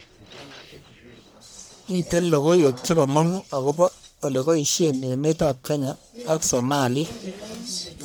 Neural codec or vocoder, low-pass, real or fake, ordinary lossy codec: codec, 44.1 kHz, 1.7 kbps, Pupu-Codec; none; fake; none